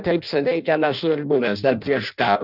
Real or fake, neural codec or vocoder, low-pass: fake; codec, 16 kHz in and 24 kHz out, 0.6 kbps, FireRedTTS-2 codec; 5.4 kHz